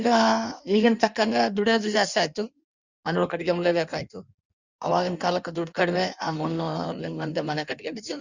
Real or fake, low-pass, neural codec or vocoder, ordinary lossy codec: fake; 7.2 kHz; codec, 16 kHz in and 24 kHz out, 1.1 kbps, FireRedTTS-2 codec; Opus, 64 kbps